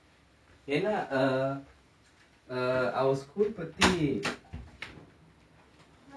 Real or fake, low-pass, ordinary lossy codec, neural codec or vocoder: real; none; none; none